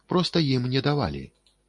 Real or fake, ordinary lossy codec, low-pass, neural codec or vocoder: real; MP3, 48 kbps; 10.8 kHz; none